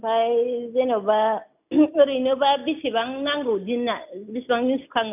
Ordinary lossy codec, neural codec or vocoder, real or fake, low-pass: none; none; real; 3.6 kHz